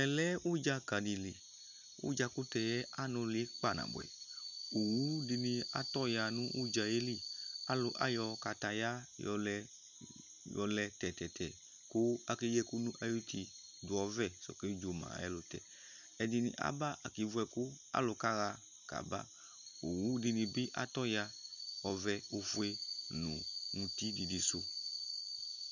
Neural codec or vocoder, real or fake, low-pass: none; real; 7.2 kHz